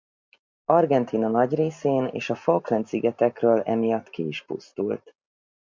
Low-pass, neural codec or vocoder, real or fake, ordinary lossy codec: 7.2 kHz; none; real; MP3, 64 kbps